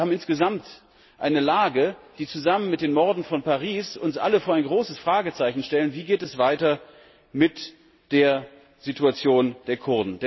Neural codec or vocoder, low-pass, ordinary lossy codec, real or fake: none; 7.2 kHz; MP3, 24 kbps; real